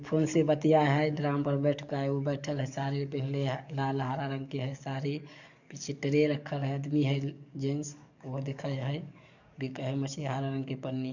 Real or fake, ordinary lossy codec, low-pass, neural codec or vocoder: fake; none; 7.2 kHz; codec, 44.1 kHz, 7.8 kbps, DAC